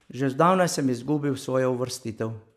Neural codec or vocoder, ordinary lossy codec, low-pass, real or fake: none; none; 14.4 kHz; real